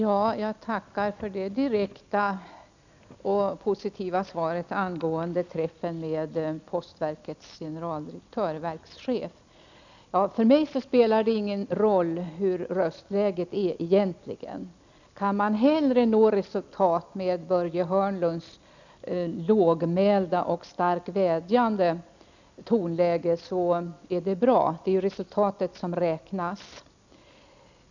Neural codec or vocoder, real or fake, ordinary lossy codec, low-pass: none; real; none; 7.2 kHz